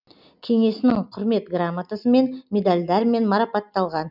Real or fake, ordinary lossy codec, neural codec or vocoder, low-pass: real; none; none; 5.4 kHz